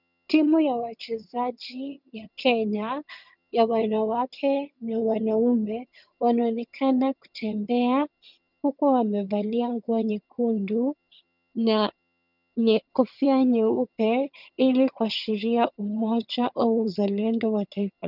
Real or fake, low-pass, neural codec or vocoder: fake; 5.4 kHz; vocoder, 22.05 kHz, 80 mel bands, HiFi-GAN